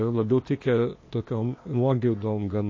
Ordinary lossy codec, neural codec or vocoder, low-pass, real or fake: MP3, 32 kbps; codec, 16 kHz, 0.8 kbps, ZipCodec; 7.2 kHz; fake